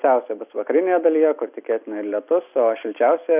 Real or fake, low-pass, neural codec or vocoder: real; 3.6 kHz; none